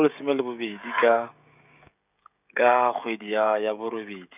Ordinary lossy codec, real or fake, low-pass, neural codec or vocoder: none; fake; 3.6 kHz; codec, 16 kHz, 16 kbps, FreqCodec, smaller model